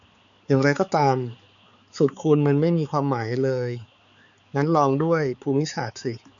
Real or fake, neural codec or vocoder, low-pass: fake; codec, 16 kHz, 4 kbps, X-Codec, HuBERT features, trained on balanced general audio; 7.2 kHz